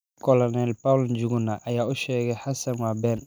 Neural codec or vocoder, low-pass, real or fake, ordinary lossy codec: none; none; real; none